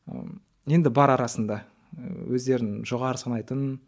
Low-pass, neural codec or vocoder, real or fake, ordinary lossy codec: none; none; real; none